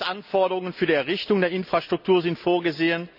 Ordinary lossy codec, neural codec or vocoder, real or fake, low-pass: none; none; real; 5.4 kHz